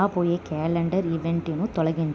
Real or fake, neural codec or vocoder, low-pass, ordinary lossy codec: real; none; none; none